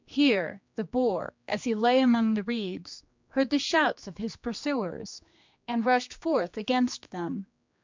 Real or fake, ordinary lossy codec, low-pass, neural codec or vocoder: fake; MP3, 64 kbps; 7.2 kHz; codec, 16 kHz, 2 kbps, X-Codec, HuBERT features, trained on general audio